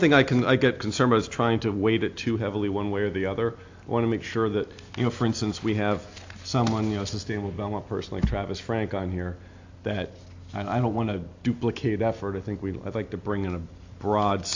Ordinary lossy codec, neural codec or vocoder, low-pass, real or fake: AAC, 48 kbps; none; 7.2 kHz; real